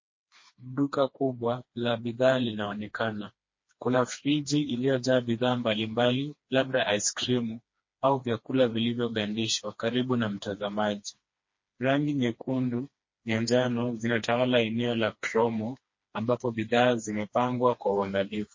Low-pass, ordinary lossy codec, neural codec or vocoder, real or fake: 7.2 kHz; MP3, 32 kbps; codec, 16 kHz, 2 kbps, FreqCodec, smaller model; fake